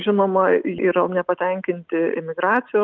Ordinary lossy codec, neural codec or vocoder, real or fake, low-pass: Opus, 32 kbps; none; real; 7.2 kHz